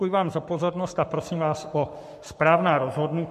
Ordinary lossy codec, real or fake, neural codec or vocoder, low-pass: MP3, 64 kbps; fake; codec, 44.1 kHz, 7.8 kbps, Pupu-Codec; 14.4 kHz